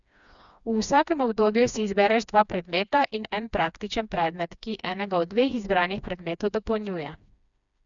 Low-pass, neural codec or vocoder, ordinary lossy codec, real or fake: 7.2 kHz; codec, 16 kHz, 2 kbps, FreqCodec, smaller model; none; fake